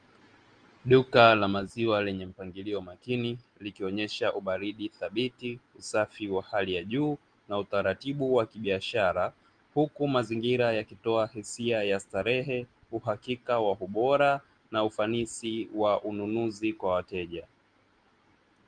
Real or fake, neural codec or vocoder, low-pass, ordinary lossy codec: real; none; 9.9 kHz; Opus, 24 kbps